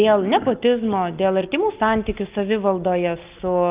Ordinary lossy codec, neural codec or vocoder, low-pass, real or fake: Opus, 24 kbps; none; 3.6 kHz; real